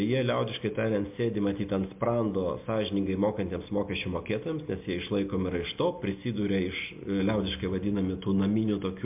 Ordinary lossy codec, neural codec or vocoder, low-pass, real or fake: MP3, 32 kbps; none; 3.6 kHz; real